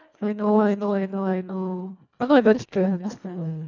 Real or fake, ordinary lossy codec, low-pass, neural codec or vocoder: fake; none; 7.2 kHz; codec, 24 kHz, 1.5 kbps, HILCodec